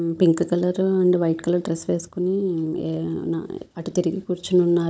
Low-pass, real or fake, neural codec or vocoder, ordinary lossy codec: none; fake; codec, 16 kHz, 16 kbps, FunCodec, trained on Chinese and English, 50 frames a second; none